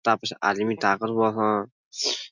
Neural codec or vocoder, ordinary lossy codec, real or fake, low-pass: none; none; real; 7.2 kHz